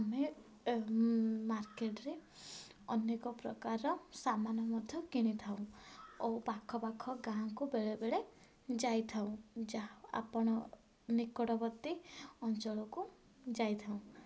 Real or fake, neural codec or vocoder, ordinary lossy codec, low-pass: real; none; none; none